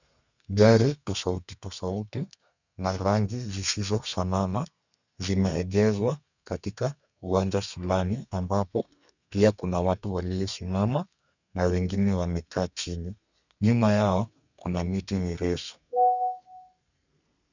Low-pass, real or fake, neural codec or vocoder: 7.2 kHz; fake; codec, 32 kHz, 1.9 kbps, SNAC